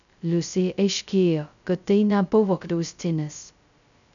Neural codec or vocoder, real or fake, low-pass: codec, 16 kHz, 0.2 kbps, FocalCodec; fake; 7.2 kHz